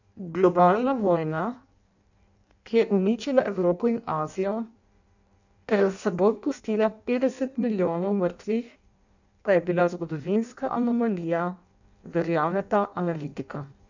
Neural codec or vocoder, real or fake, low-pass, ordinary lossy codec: codec, 16 kHz in and 24 kHz out, 0.6 kbps, FireRedTTS-2 codec; fake; 7.2 kHz; none